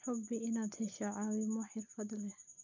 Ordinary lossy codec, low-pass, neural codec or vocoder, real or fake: none; 7.2 kHz; none; real